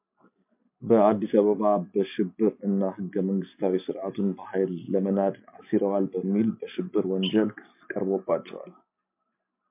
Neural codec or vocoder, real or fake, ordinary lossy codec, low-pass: none; real; MP3, 32 kbps; 3.6 kHz